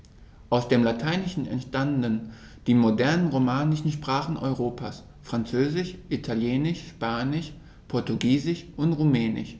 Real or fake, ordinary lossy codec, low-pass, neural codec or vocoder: real; none; none; none